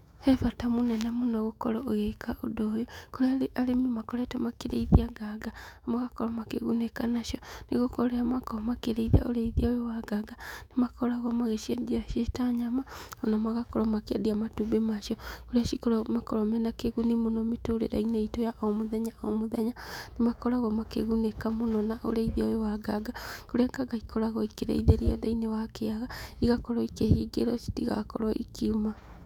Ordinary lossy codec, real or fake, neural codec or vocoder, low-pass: none; fake; autoencoder, 48 kHz, 128 numbers a frame, DAC-VAE, trained on Japanese speech; 19.8 kHz